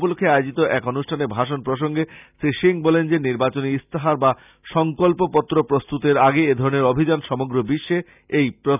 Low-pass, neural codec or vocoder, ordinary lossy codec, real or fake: 3.6 kHz; none; none; real